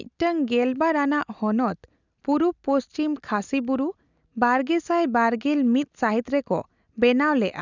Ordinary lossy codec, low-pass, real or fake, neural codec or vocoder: none; 7.2 kHz; real; none